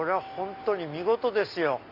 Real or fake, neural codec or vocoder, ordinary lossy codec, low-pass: real; none; MP3, 48 kbps; 5.4 kHz